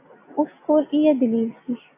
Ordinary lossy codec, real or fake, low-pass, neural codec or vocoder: AAC, 24 kbps; real; 3.6 kHz; none